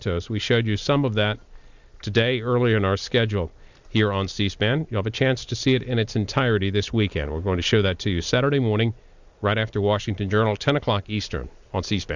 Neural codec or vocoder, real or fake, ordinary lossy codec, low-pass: none; real; Opus, 64 kbps; 7.2 kHz